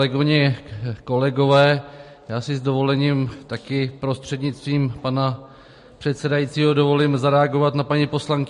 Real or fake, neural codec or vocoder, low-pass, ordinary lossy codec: real; none; 10.8 kHz; MP3, 48 kbps